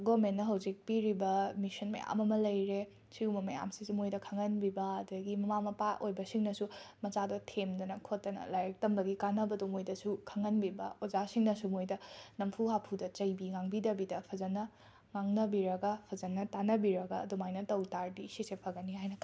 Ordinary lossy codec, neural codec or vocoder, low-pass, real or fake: none; none; none; real